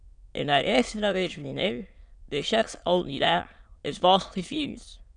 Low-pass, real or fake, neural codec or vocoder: 9.9 kHz; fake; autoencoder, 22.05 kHz, a latent of 192 numbers a frame, VITS, trained on many speakers